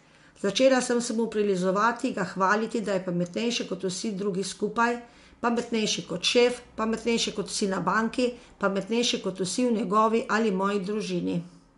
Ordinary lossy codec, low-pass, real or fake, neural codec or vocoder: MP3, 64 kbps; 10.8 kHz; real; none